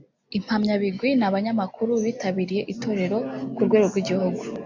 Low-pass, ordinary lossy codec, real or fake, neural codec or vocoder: 7.2 kHz; AAC, 48 kbps; real; none